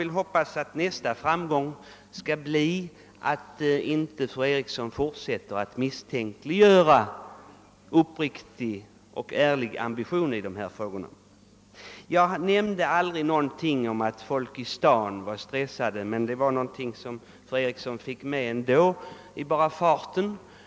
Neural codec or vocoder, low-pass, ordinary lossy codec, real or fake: none; none; none; real